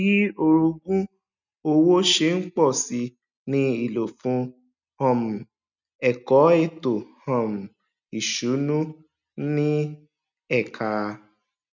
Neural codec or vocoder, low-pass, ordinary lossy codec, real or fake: none; 7.2 kHz; none; real